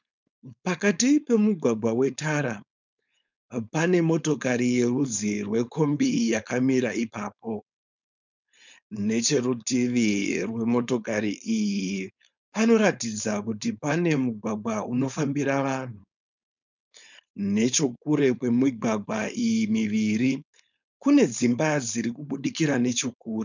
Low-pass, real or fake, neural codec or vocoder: 7.2 kHz; fake; codec, 16 kHz, 4.8 kbps, FACodec